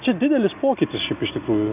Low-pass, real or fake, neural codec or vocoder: 3.6 kHz; real; none